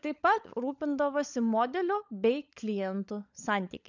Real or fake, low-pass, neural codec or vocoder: fake; 7.2 kHz; codec, 16 kHz, 16 kbps, FunCodec, trained on LibriTTS, 50 frames a second